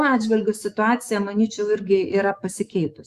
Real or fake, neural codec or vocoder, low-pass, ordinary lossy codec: fake; autoencoder, 48 kHz, 128 numbers a frame, DAC-VAE, trained on Japanese speech; 14.4 kHz; Opus, 64 kbps